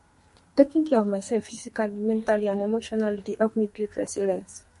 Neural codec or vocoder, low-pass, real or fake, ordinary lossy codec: codec, 32 kHz, 1.9 kbps, SNAC; 14.4 kHz; fake; MP3, 48 kbps